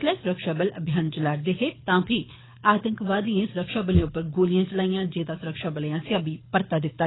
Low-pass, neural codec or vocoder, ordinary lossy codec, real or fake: 7.2 kHz; codec, 24 kHz, 6 kbps, HILCodec; AAC, 16 kbps; fake